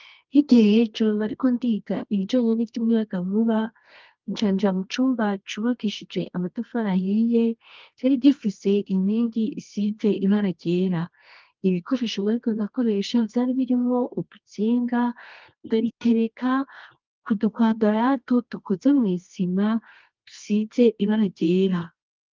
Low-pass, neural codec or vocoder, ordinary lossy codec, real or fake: 7.2 kHz; codec, 24 kHz, 0.9 kbps, WavTokenizer, medium music audio release; Opus, 32 kbps; fake